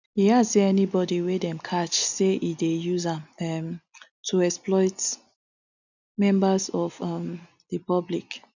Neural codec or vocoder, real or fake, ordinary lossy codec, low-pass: none; real; none; 7.2 kHz